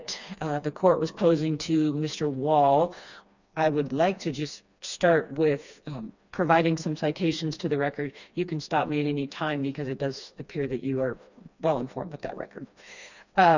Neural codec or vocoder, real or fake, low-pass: codec, 16 kHz, 2 kbps, FreqCodec, smaller model; fake; 7.2 kHz